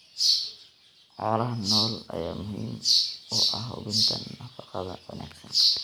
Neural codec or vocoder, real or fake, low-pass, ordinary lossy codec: none; real; none; none